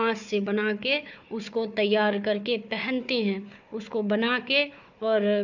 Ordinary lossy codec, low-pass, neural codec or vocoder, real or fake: none; 7.2 kHz; codec, 16 kHz, 4 kbps, FunCodec, trained on Chinese and English, 50 frames a second; fake